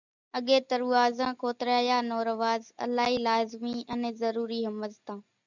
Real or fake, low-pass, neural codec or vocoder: real; 7.2 kHz; none